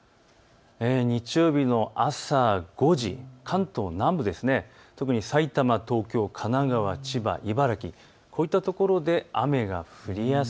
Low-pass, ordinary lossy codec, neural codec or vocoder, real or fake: none; none; none; real